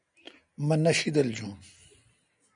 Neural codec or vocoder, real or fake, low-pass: none; real; 9.9 kHz